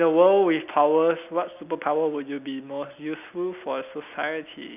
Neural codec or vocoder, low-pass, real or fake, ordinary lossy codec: none; 3.6 kHz; real; none